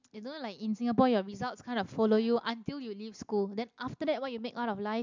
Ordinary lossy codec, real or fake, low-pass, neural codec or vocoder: MP3, 64 kbps; real; 7.2 kHz; none